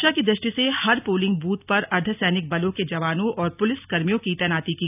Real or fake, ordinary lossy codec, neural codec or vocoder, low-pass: real; none; none; 3.6 kHz